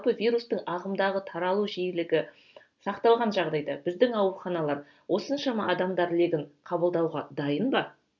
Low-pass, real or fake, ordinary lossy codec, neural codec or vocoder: 7.2 kHz; real; none; none